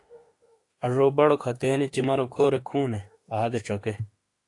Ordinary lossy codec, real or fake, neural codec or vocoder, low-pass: AAC, 48 kbps; fake; autoencoder, 48 kHz, 32 numbers a frame, DAC-VAE, trained on Japanese speech; 10.8 kHz